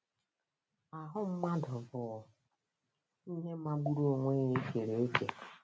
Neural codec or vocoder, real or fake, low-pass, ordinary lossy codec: none; real; none; none